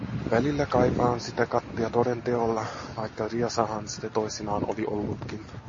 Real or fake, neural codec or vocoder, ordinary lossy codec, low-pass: real; none; MP3, 32 kbps; 7.2 kHz